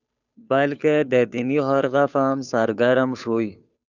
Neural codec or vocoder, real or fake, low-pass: codec, 16 kHz, 2 kbps, FunCodec, trained on Chinese and English, 25 frames a second; fake; 7.2 kHz